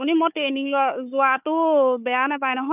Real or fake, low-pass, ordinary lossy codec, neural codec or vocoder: fake; 3.6 kHz; none; codec, 16 kHz, 4 kbps, FunCodec, trained on Chinese and English, 50 frames a second